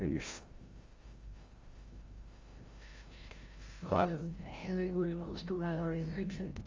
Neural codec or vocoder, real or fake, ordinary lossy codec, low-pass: codec, 16 kHz, 0.5 kbps, FreqCodec, larger model; fake; Opus, 32 kbps; 7.2 kHz